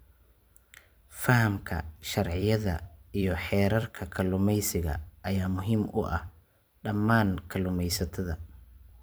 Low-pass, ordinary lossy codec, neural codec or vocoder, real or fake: none; none; vocoder, 44.1 kHz, 128 mel bands every 256 samples, BigVGAN v2; fake